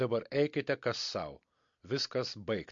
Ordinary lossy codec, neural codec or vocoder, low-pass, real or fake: MP3, 48 kbps; none; 7.2 kHz; real